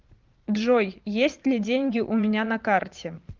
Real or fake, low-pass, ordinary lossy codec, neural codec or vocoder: fake; 7.2 kHz; Opus, 32 kbps; vocoder, 22.05 kHz, 80 mel bands, Vocos